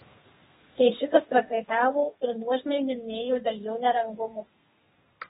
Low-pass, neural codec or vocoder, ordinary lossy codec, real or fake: 14.4 kHz; codec, 32 kHz, 1.9 kbps, SNAC; AAC, 16 kbps; fake